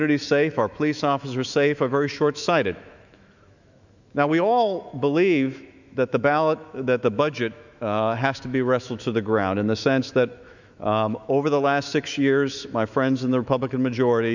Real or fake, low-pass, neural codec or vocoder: fake; 7.2 kHz; autoencoder, 48 kHz, 128 numbers a frame, DAC-VAE, trained on Japanese speech